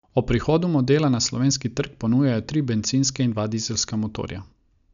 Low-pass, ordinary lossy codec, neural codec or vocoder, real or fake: 7.2 kHz; none; none; real